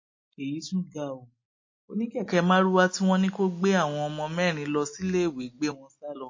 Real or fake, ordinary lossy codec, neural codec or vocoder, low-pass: real; MP3, 32 kbps; none; 7.2 kHz